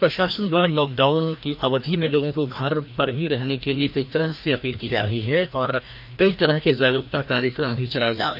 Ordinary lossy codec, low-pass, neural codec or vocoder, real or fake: none; 5.4 kHz; codec, 16 kHz, 1 kbps, FreqCodec, larger model; fake